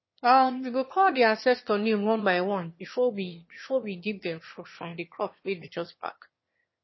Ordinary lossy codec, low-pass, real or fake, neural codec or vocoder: MP3, 24 kbps; 7.2 kHz; fake; autoencoder, 22.05 kHz, a latent of 192 numbers a frame, VITS, trained on one speaker